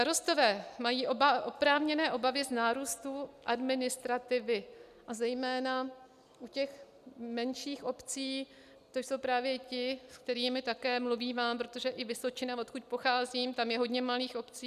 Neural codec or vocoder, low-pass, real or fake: none; 14.4 kHz; real